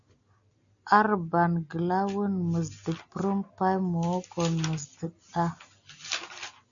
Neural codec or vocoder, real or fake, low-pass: none; real; 7.2 kHz